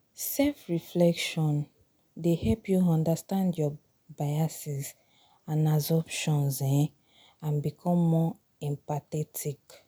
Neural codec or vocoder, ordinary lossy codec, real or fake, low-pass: none; none; real; none